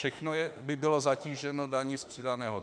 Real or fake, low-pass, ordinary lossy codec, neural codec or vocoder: fake; 9.9 kHz; MP3, 96 kbps; autoencoder, 48 kHz, 32 numbers a frame, DAC-VAE, trained on Japanese speech